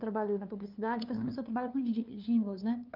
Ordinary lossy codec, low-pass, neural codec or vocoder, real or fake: Opus, 24 kbps; 5.4 kHz; codec, 16 kHz, 2 kbps, FunCodec, trained on LibriTTS, 25 frames a second; fake